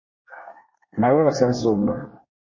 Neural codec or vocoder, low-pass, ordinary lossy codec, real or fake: codec, 24 kHz, 1 kbps, SNAC; 7.2 kHz; MP3, 32 kbps; fake